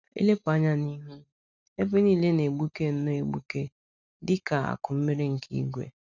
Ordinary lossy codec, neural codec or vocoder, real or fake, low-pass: none; none; real; 7.2 kHz